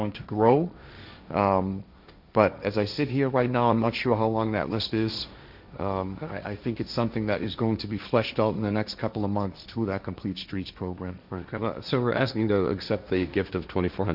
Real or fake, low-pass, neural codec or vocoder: fake; 5.4 kHz; codec, 16 kHz, 1.1 kbps, Voila-Tokenizer